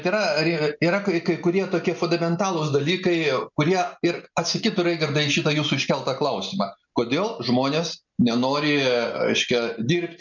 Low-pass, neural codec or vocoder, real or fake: 7.2 kHz; none; real